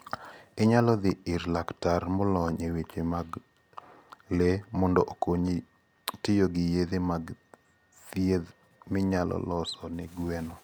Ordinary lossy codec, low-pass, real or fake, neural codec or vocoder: none; none; real; none